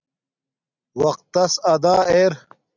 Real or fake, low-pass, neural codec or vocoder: real; 7.2 kHz; none